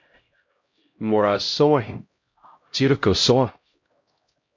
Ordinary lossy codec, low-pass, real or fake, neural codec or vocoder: MP3, 48 kbps; 7.2 kHz; fake; codec, 16 kHz, 0.5 kbps, X-Codec, HuBERT features, trained on LibriSpeech